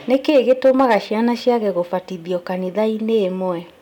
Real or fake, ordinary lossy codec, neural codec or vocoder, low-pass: real; none; none; 19.8 kHz